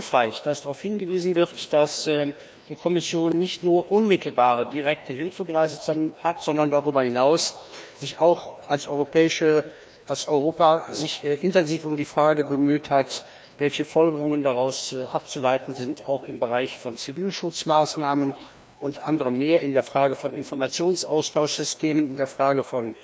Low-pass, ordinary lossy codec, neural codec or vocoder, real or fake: none; none; codec, 16 kHz, 1 kbps, FreqCodec, larger model; fake